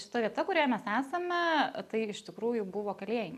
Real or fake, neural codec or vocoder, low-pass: real; none; 14.4 kHz